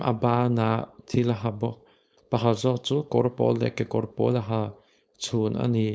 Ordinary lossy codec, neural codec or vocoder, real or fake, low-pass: none; codec, 16 kHz, 4.8 kbps, FACodec; fake; none